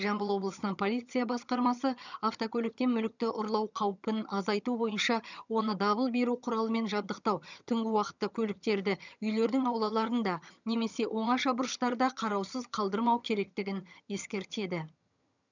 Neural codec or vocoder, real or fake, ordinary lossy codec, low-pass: vocoder, 22.05 kHz, 80 mel bands, HiFi-GAN; fake; none; 7.2 kHz